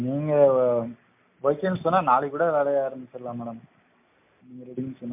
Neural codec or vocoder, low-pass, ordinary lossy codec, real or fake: none; 3.6 kHz; MP3, 32 kbps; real